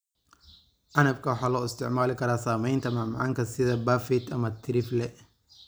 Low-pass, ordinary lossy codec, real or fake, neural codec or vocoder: none; none; real; none